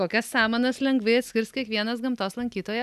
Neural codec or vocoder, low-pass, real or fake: none; 14.4 kHz; real